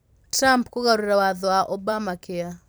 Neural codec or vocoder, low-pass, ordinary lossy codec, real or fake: vocoder, 44.1 kHz, 128 mel bands every 256 samples, BigVGAN v2; none; none; fake